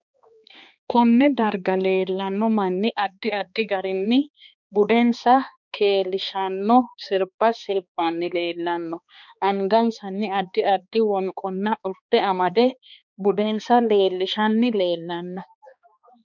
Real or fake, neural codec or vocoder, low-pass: fake; codec, 16 kHz, 2 kbps, X-Codec, HuBERT features, trained on balanced general audio; 7.2 kHz